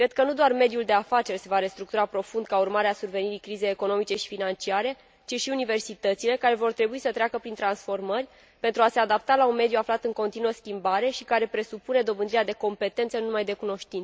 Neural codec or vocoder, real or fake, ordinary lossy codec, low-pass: none; real; none; none